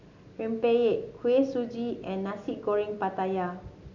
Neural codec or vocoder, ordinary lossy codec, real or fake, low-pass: none; none; real; 7.2 kHz